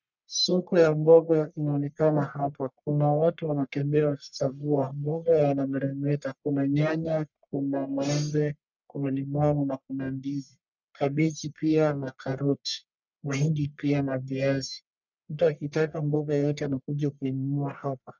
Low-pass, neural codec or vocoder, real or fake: 7.2 kHz; codec, 44.1 kHz, 1.7 kbps, Pupu-Codec; fake